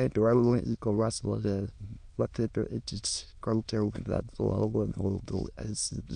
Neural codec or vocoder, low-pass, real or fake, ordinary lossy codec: autoencoder, 22.05 kHz, a latent of 192 numbers a frame, VITS, trained on many speakers; 9.9 kHz; fake; none